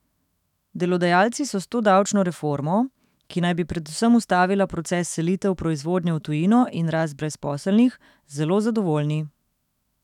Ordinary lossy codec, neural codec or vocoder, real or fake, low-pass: none; autoencoder, 48 kHz, 128 numbers a frame, DAC-VAE, trained on Japanese speech; fake; 19.8 kHz